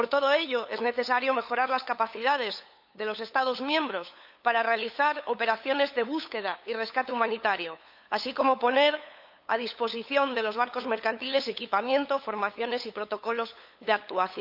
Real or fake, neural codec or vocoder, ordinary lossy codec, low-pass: fake; codec, 16 kHz, 8 kbps, FunCodec, trained on LibriTTS, 25 frames a second; AAC, 48 kbps; 5.4 kHz